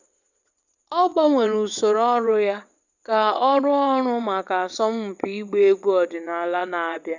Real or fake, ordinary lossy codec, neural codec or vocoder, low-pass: fake; none; vocoder, 22.05 kHz, 80 mel bands, WaveNeXt; 7.2 kHz